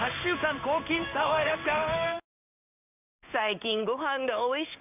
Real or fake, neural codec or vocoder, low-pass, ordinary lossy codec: fake; codec, 16 kHz in and 24 kHz out, 1 kbps, XY-Tokenizer; 3.6 kHz; none